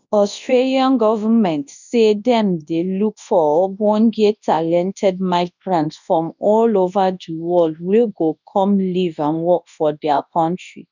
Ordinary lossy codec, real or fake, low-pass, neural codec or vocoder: none; fake; 7.2 kHz; codec, 24 kHz, 0.9 kbps, WavTokenizer, large speech release